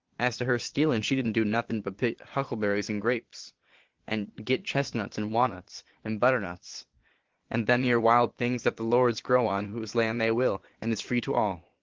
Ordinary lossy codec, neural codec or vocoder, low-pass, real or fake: Opus, 16 kbps; vocoder, 22.05 kHz, 80 mel bands, Vocos; 7.2 kHz; fake